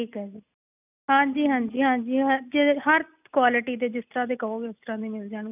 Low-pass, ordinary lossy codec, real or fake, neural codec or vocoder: 3.6 kHz; none; real; none